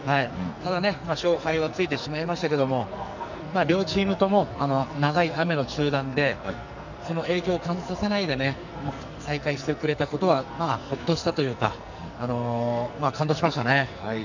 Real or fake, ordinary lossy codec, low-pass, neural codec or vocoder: fake; none; 7.2 kHz; codec, 44.1 kHz, 2.6 kbps, SNAC